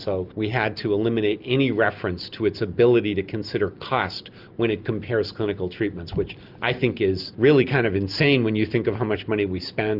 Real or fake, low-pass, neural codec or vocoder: real; 5.4 kHz; none